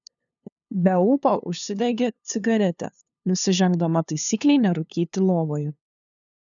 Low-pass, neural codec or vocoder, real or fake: 7.2 kHz; codec, 16 kHz, 2 kbps, FunCodec, trained on LibriTTS, 25 frames a second; fake